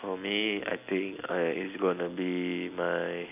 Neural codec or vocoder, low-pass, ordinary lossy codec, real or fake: none; 3.6 kHz; AAC, 32 kbps; real